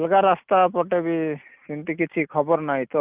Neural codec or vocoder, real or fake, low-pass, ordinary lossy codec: none; real; 3.6 kHz; Opus, 24 kbps